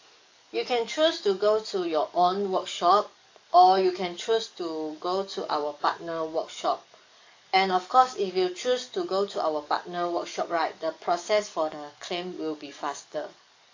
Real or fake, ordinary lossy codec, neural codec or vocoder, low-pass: fake; AAC, 48 kbps; codec, 44.1 kHz, 7.8 kbps, DAC; 7.2 kHz